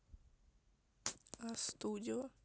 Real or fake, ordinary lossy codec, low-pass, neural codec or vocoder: real; none; none; none